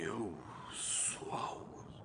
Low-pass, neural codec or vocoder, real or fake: 9.9 kHz; vocoder, 22.05 kHz, 80 mel bands, Vocos; fake